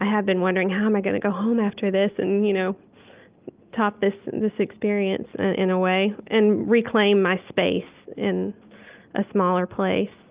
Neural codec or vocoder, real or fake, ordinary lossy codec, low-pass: none; real; Opus, 32 kbps; 3.6 kHz